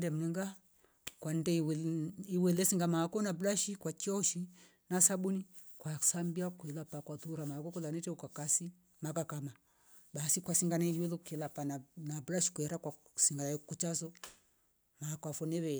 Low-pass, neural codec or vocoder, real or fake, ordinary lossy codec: none; none; real; none